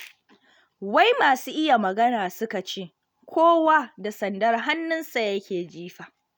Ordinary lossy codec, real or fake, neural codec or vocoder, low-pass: none; real; none; none